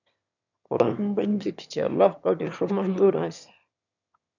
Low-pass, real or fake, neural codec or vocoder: 7.2 kHz; fake; autoencoder, 22.05 kHz, a latent of 192 numbers a frame, VITS, trained on one speaker